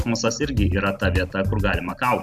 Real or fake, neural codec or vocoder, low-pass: real; none; 14.4 kHz